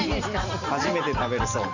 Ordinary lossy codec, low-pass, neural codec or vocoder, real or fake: none; 7.2 kHz; none; real